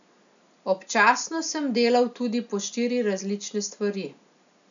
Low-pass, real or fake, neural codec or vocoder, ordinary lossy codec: 7.2 kHz; real; none; none